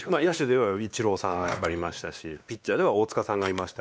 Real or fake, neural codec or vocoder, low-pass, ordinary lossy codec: fake; codec, 16 kHz, 2 kbps, X-Codec, WavLM features, trained on Multilingual LibriSpeech; none; none